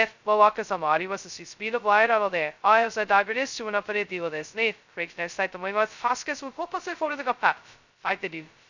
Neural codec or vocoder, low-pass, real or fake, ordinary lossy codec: codec, 16 kHz, 0.2 kbps, FocalCodec; 7.2 kHz; fake; none